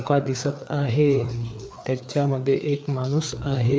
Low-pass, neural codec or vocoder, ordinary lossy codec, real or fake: none; codec, 16 kHz, 4 kbps, FreqCodec, larger model; none; fake